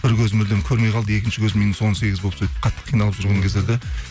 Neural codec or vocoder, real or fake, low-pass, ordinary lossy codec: none; real; none; none